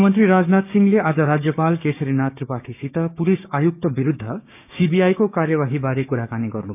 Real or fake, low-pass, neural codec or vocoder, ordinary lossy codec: fake; 3.6 kHz; codec, 16 kHz, 6 kbps, DAC; none